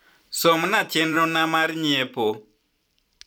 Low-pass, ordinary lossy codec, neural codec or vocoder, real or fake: none; none; vocoder, 44.1 kHz, 128 mel bands every 512 samples, BigVGAN v2; fake